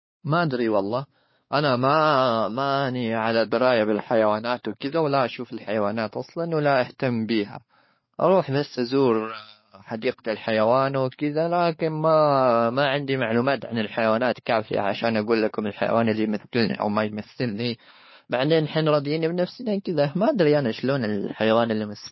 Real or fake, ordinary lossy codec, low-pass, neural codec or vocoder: fake; MP3, 24 kbps; 7.2 kHz; codec, 16 kHz, 4 kbps, X-Codec, WavLM features, trained on Multilingual LibriSpeech